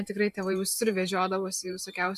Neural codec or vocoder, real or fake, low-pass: vocoder, 44.1 kHz, 128 mel bands every 512 samples, BigVGAN v2; fake; 14.4 kHz